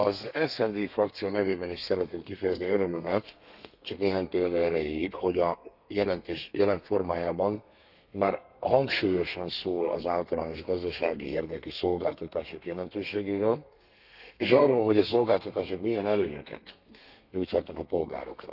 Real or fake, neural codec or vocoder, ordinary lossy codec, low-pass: fake; codec, 32 kHz, 1.9 kbps, SNAC; none; 5.4 kHz